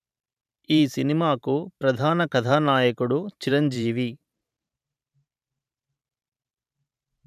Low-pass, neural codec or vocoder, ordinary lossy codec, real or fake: 14.4 kHz; vocoder, 44.1 kHz, 128 mel bands every 512 samples, BigVGAN v2; none; fake